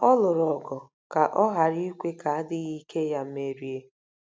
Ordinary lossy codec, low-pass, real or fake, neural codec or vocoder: none; none; real; none